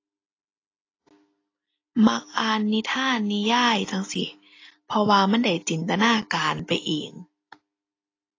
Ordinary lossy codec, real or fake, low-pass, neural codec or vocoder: AAC, 32 kbps; real; 7.2 kHz; none